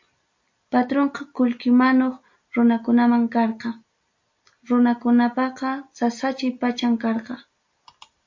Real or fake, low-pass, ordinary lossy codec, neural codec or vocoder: real; 7.2 kHz; MP3, 48 kbps; none